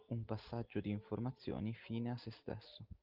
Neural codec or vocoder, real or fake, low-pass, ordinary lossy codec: none; real; 5.4 kHz; Opus, 32 kbps